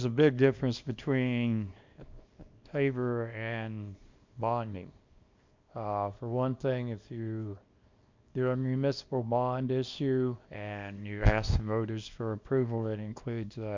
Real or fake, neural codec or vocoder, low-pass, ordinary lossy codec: fake; codec, 24 kHz, 0.9 kbps, WavTokenizer, small release; 7.2 kHz; MP3, 64 kbps